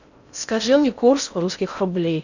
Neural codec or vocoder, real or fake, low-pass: codec, 16 kHz in and 24 kHz out, 0.6 kbps, FocalCodec, streaming, 4096 codes; fake; 7.2 kHz